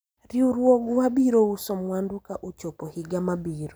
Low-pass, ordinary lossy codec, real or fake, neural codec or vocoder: none; none; real; none